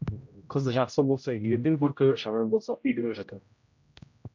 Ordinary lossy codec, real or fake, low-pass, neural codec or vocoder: MP3, 64 kbps; fake; 7.2 kHz; codec, 16 kHz, 0.5 kbps, X-Codec, HuBERT features, trained on general audio